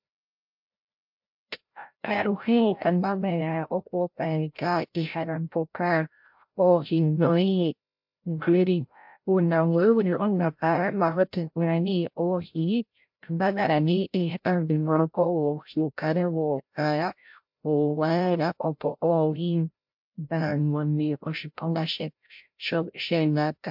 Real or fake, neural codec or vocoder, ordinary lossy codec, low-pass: fake; codec, 16 kHz, 0.5 kbps, FreqCodec, larger model; MP3, 48 kbps; 5.4 kHz